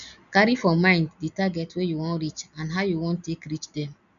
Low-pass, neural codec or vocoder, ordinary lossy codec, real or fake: 7.2 kHz; none; none; real